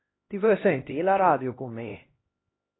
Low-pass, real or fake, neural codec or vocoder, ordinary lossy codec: 7.2 kHz; fake; codec, 16 kHz, 1 kbps, X-Codec, HuBERT features, trained on LibriSpeech; AAC, 16 kbps